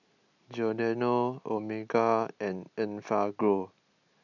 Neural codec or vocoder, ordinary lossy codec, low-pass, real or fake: none; none; 7.2 kHz; real